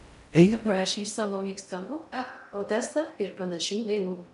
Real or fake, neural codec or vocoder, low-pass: fake; codec, 16 kHz in and 24 kHz out, 0.6 kbps, FocalCodec, streaming, 4096 codes; 10.8 kHz